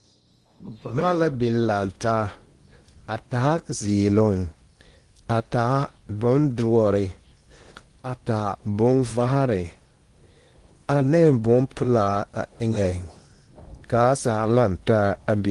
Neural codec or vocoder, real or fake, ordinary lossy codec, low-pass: codec, 16 kHz in and 24 kHz out, 0.8 kbps, FocalCodec, streaming, 65536 codes; fake; Opus, 24 kbps; 10.8 kHz